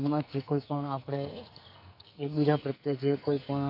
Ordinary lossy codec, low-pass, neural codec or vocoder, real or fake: none; 5.4 kHz; codec, 44.1 kHz, 2.6 kbps, SNAC; fake